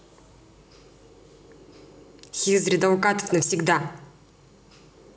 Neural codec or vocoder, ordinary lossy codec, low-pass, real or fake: none; none; none; real